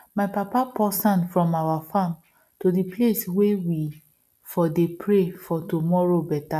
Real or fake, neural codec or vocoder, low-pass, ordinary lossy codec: real; none; 14.4 kHz; none